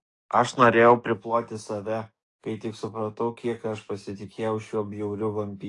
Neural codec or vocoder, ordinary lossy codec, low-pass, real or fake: codec, 44.1 kHz, 7.8 kbps, DAC; AAC, 48 kbps; 10.8 kHz; fake